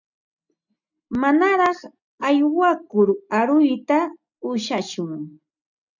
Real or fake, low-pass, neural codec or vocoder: real; 7.2 kHz; none